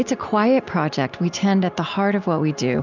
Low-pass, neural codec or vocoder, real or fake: 7.2 kHz; none; real